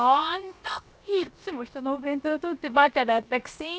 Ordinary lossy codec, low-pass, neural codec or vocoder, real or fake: none; none; codec, 16 kHz, 0.7 kbps, FocalCodec; fake